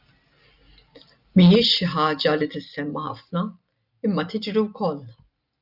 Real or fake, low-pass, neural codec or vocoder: real; 5.4 kHz; none